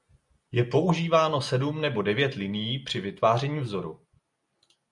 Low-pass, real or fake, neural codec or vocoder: 10.8 kHz; real; none